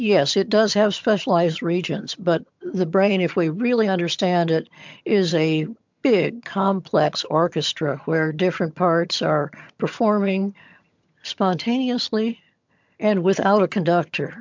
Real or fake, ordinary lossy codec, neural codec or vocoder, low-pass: fake; MP3, 64 kbps; vocoder, 22.05 kHz, 80 mel bands, HiFi-GAN; 7.2 kHz